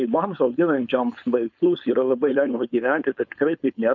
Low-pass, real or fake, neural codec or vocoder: 7.2 kHz; fake; codec, 16 kHz, 4.8 kbps, FACodec